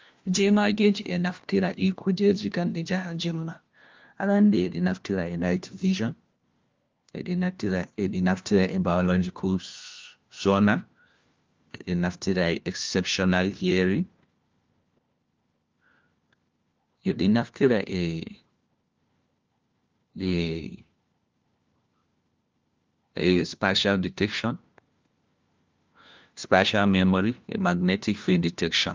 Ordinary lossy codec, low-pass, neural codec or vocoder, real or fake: Opus, 32 kbps; 7.2 kHz; codec, 16 kHz, 1 kbps, FunCodec, trained on LibriTTS, 50 frames a second; fake